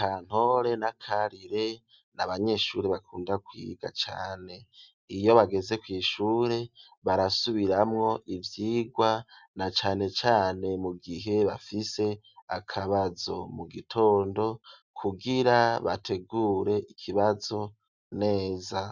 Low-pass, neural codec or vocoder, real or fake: 7.2 kHz; none; real